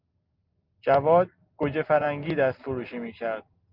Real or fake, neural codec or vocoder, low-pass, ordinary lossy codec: real; none; 5.4 kHz; Opus, 32 kbps